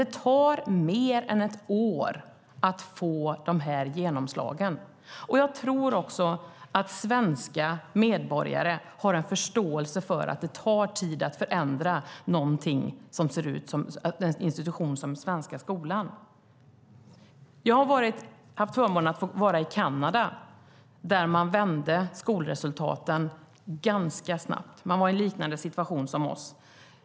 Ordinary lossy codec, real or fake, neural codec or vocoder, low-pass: none; real; none; none